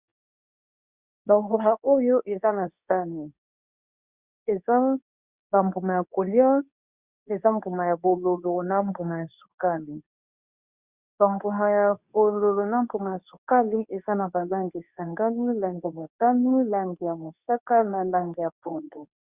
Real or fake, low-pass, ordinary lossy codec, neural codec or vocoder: fake; 3.6 kHz; Opus, 64 kbps; codec, 24 kHz, 0.9 kbps, WavTokenizer, medium speech release version 2